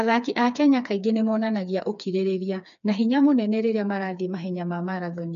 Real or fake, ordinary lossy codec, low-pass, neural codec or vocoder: fake; none; 7.2 kHz; codec, 16 kHz, 4 kbps, FreqCodec, smaller model